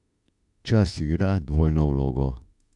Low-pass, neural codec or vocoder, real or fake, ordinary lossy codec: 10.8 kHz; autoencoder, 48 kHz, 32 numbers a frame, DAC-VAE, trained on Japanese speech; fake; none